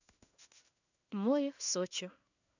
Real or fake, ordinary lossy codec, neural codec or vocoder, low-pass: fake; none; codec, 16 kHz in and 24 kHz out, 1 kbps, XY-Tokenizer; 7.2 kHz